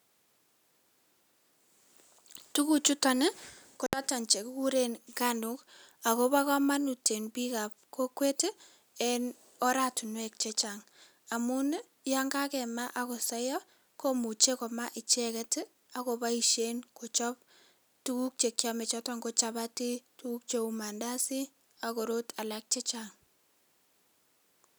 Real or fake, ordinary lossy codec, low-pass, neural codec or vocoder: real; none; none; none